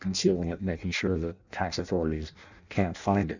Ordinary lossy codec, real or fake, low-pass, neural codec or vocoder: Opus, 64 kbps; fake; 7.2 kHz; codec, 16 kHz in and 24 kHz out, 0.6 kbps, FireRedTTS-2 codec